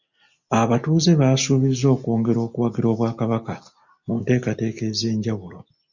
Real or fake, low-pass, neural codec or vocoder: real; 7.2 kHz; none